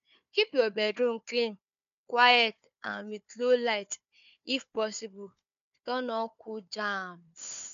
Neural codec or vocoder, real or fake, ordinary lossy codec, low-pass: codec, 16 kHz, 4 kbps, FunCodec, trained on Chinese and English, 50 frames a second; fake; none; 7.2 kHz